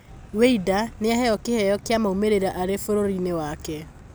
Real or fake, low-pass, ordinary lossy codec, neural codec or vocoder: real; none; none; none